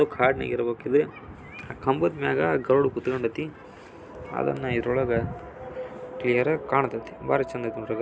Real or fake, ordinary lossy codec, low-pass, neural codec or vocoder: real; none; none; none